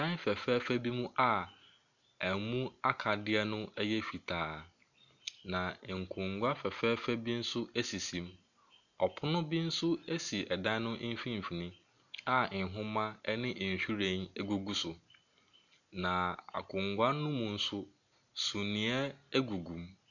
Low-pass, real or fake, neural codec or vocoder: 7.2 kHz; real; none